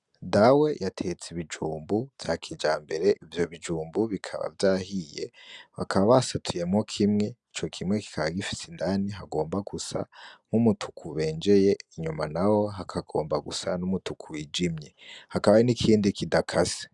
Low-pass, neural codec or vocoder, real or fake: 10.8 kHz; none; real